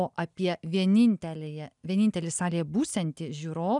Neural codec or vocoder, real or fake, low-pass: none; real; 10.8 kHz